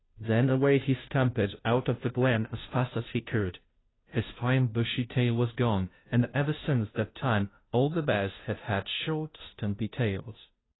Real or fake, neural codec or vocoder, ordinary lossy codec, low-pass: fake; codec, 16 kHz, 0.5 kbps, FunCodec, trained on Chinese and English, 25 frames a second; AAC, 16 kbps; 7.2 kHz